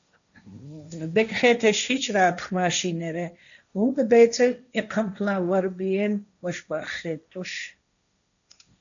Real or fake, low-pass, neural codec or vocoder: fake; 7.2 kHz; codec, 16 kHz, 1.1 kbps, Voila-Tokenizer